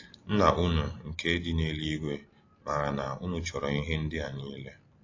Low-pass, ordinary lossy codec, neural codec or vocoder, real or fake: 7.2 kHz; AAC, 32 kbps; none; real